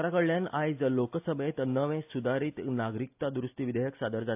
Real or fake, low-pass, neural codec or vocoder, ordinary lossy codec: real; 3.6 kHz; none; AAC, 32 kbps